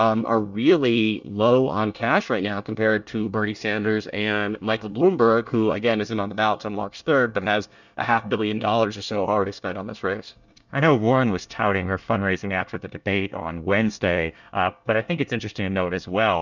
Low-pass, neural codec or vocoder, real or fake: 7.2 kHz; codec, 24 kHz, 1 kbps, SNAC; fake